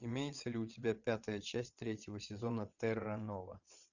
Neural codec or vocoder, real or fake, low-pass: vocoder, 22.05 kHz, 80 mel bands, WaveNeXt; fake; 7.2 kHz